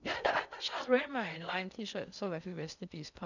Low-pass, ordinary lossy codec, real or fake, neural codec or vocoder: 7.2 kHz; none; fake; codec, 16 kHz in and 24 kHz out, 0.6 kbps, FocalCodec, streaming, 4096 codes